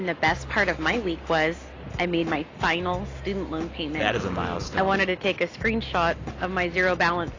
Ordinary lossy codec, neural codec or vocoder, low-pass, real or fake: AAC, 32 kbps; none; 7.2 kHz; real